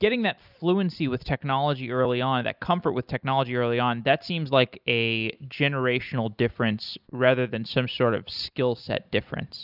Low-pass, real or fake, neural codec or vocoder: 5.4 kHz; real; none